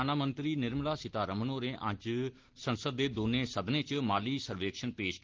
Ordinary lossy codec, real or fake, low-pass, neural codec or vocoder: Opus, 16 kbps; real; 7.2 kHz; none